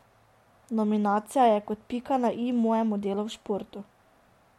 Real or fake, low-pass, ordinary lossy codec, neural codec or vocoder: real; 19.8 kHz; MP3, 64 kbps; none